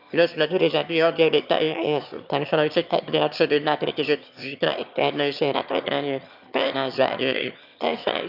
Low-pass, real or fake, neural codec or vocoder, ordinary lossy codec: 5.4 kHz; fake; autoencoder, 22.05 kHz, a latent of 192 numbers a frame, VITS, trained on one speaker; none